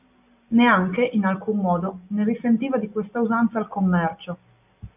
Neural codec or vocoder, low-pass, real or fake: none; 3.6 kHz; real